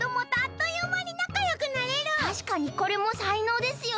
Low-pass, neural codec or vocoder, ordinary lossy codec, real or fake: none; none; none; real